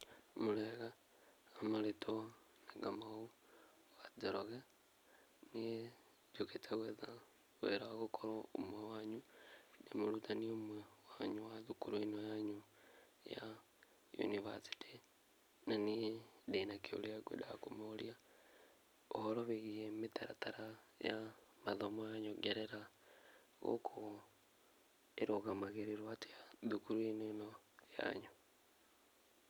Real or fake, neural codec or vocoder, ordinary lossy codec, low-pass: real; none; none; none